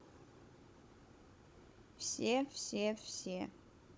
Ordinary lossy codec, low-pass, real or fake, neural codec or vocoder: none; none; fake; codec, 16 kHz, 16 kbps, FunCodec, trained on Chinese and English, 50 frames a second